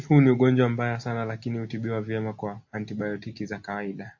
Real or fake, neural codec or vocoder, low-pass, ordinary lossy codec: real; none; 7.2 kHz; AAC, 48 kbps